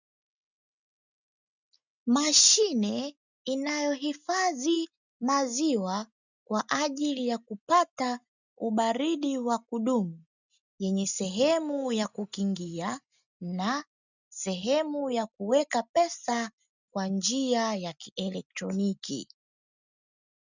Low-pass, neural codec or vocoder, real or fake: 7.2 kHz; none; real